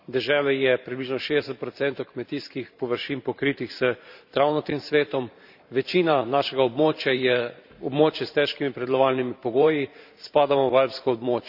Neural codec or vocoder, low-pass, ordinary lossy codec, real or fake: vocoder, 44.1 kHz, 128 mel bands every 512 samples, BigVGAN v2; 5.4 kHz; none; fake